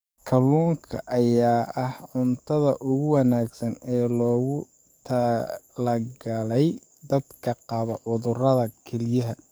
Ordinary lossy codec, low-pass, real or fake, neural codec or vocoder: none; none; fake; codec, 44.1 kHz, 7.8 kbps, DAC